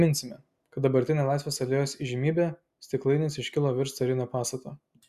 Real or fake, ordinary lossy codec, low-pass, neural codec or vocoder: real; Opus, 64 kbps; 14.4 kHz; none